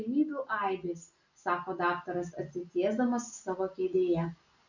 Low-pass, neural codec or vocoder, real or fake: 7.2 kHz; none; real